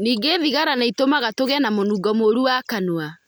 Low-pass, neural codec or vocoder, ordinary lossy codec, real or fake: none; none; none; real